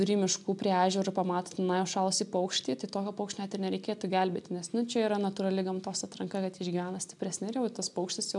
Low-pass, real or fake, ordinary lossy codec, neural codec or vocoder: 10.8 kHz; real; MP3, 96 kbps; none